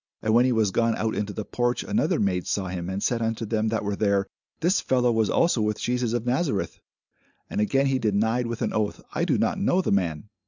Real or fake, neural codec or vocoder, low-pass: real; none; 7.2 kHz